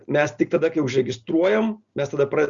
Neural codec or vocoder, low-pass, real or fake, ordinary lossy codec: none; 7.2 kHz; real; Opus, 64 kbps